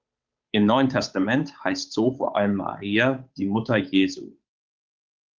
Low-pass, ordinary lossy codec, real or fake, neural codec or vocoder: 7.2 kHz; Opus, 24 kbps; fake; codec, 16 kHz, 8 kbps, FunCodec, trained on Chinese and English, 25 frames a second